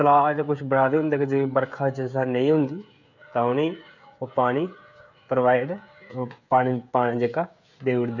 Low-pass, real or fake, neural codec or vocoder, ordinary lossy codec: 7.2 kHz; fake; codec, 16 kHz, 16 kbps, FreqCodec, smaller model; none